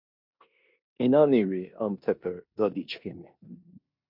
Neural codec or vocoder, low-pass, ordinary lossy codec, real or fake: codec, 16 kHz in and 24 kHz out, 0.9 kbps, LongCat-Audio-Codec, four codebook decoder; 5.4 kHz; MP3, 48 kbps; fake